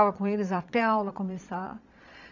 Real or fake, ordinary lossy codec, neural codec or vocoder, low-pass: fake; none; vocoder, 22.05 kHz, 80 mel bands, Vocos; 7.2 kHz